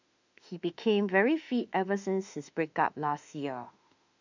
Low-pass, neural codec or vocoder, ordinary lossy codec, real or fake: 7.2 kHz; autoencoder, 48 kHz, 32 numbers a frame, DAC-VAE, trained on Japanese speech; none; fake